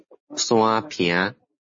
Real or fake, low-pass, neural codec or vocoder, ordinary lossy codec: real; 7.2 kHz; none; MP3, 32 kbps